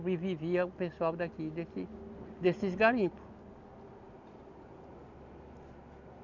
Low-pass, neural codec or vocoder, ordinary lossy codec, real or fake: 7.2 kHz; none; none; real